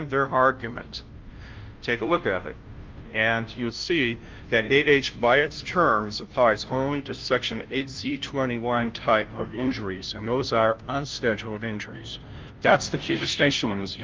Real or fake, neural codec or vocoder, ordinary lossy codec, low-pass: fake; codec, 16 kHz, 0.5 kbps, FunCodec, trained on Chinese and English, 25 frames a second; Opus, 24 kbps; 7.2 kHz